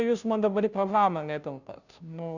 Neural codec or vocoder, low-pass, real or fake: codec, 16 kHz, 0.5 kbps, FunCodec, trained on Chinese and English, 25 frames a second; 7.2 kHz; fake